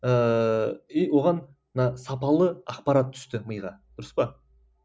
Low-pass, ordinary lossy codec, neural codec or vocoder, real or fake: none; none; none; real